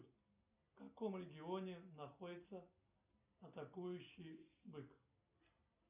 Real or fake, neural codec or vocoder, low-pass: real; none; 3.6 kHz